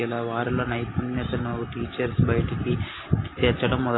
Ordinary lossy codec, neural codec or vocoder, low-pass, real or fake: AAC, 16 kbps; none; 7.2 kHz; real